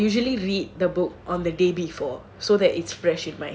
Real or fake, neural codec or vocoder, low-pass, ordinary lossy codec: real; none; none; none